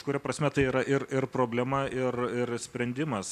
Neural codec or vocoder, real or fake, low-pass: none; real; 14.4 kHz